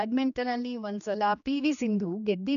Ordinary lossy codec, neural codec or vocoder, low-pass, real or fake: none; codec, 16 kHz, 2 kbps, X-Codec, HuBERT features, trained on general audio; 7.2 kHz; fake